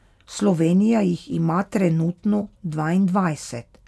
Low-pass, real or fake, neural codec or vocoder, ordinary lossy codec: none; real; none; none